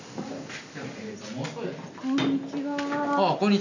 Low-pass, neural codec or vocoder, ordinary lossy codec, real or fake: 7.2 kHz; none; none; real